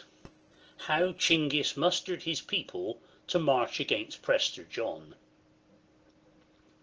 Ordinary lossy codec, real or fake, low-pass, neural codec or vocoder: Opus, 16 kbps; real; 7.2 kHz; none